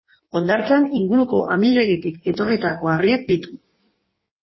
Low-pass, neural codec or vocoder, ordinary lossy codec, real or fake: 7.2 kHz; codec, 16 kHz in and 24 kHz out, 1.1 kbps, FireRedTTS-2 codec; MP3, 24 kbps; fake